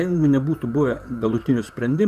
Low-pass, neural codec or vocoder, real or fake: 14.4 kHz; vocoder, 44.1 kHz, 128 mel bands, Pupu-Vocoder; fake